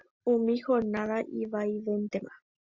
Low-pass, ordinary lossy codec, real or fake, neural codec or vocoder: 7.2 kHz; Opus, 64 kbps; real; none